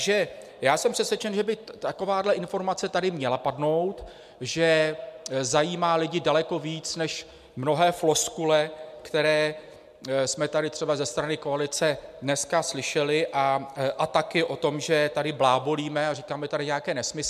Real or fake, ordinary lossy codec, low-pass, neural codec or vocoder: real; MP3, 96 kbps; 14.4 kHz; none